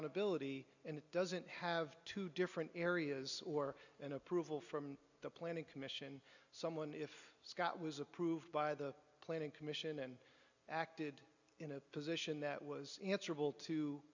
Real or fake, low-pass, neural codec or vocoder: real; 7.2 kHz; none